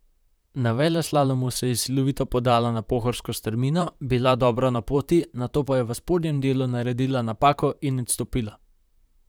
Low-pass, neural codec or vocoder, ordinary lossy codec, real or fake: none; vocoder, 44.1 kHz, 128 mel bands, Pupu-Vocoder; none; fake